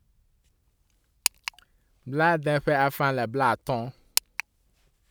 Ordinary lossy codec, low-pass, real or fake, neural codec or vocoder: none; none; real; none